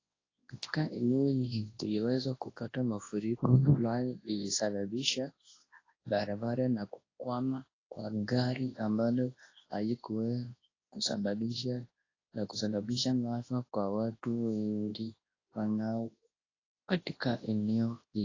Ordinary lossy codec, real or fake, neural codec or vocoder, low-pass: AAC, 32 kbps; fake; codec, 24 kHz, 0.9 kbps, WavTokenizer, large speech release; 7.2 kHz